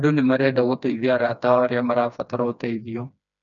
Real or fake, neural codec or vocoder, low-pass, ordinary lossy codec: fake; codec, 16 kHz, 2 kbps, FreqCodec, smaller model; 7.2 kHz; none